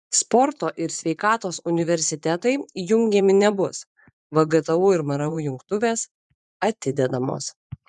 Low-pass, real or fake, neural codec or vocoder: 10.8 kHz; fake; vocoder, 24 kHz, 100 mel bands, Vocos